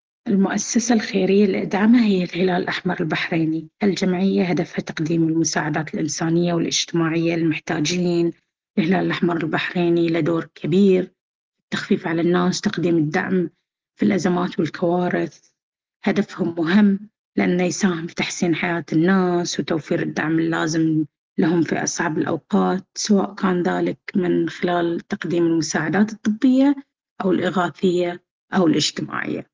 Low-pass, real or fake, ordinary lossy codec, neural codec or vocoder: 7.2 kHz; real; Opus, 16 kbps; none